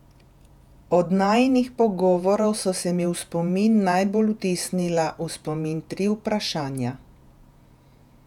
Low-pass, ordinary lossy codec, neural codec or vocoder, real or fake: 19.8 kHz; none; vocoder, 48 kHz, 128 mel bands, Vocos; fake